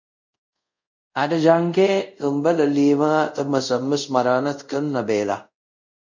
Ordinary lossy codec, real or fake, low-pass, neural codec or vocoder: MP3, 64 kbps; fake; 7.2 kHz; codec, 24 kHz, 0.5 kbps, DualCodec